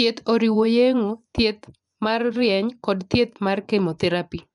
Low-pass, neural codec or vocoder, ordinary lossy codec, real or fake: 10.8 kHz; vocoder, 24 kHz, 100 mel bands, Vocos; none; fake